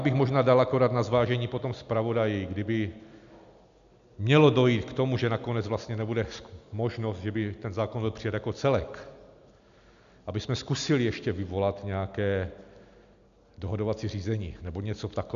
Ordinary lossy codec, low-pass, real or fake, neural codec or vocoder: MP3, 96 kbps; 7.2 kHz; real; none